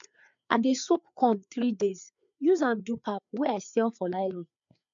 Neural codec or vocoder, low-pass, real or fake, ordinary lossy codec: codec, 16 kHz, 4 kbps, FreqCodec, larger model; 7.2 kHz; fake; MP3, 64 kbps